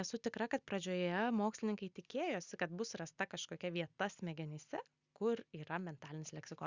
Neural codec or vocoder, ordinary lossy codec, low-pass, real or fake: none; Opus, 64 kbps; 7.2 kHz; real